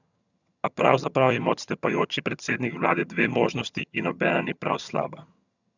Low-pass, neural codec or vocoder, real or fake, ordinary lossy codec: 7.2 kHz; vocoder, 22.05 kHz, 80 mel bands, HiFi-GAN; fake; none